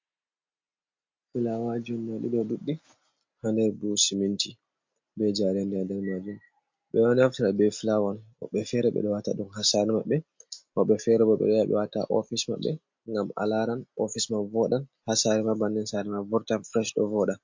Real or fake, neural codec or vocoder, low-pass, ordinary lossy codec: real; none; 7.2 kHz; MP3, 48 kbps